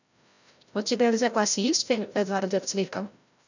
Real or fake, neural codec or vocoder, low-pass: fake; codec, 16 kHz, 0.5 kbps, FreqCodec, larger model; 7.2 kHz